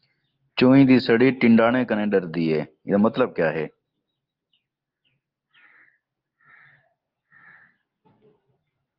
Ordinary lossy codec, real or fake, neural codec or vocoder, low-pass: Opus, 32 kbps; real; none; 5.4 kHz